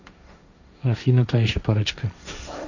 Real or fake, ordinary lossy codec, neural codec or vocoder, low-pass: fake; none; codec, 16 kHz, 1.1 kbps, Voila-Tokenizer; 7.2 kHz